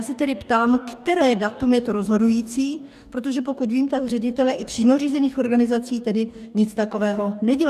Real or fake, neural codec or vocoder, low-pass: fake; codec, 44.1 kHz, 2.6 kbps, DAC; 14.4 kHz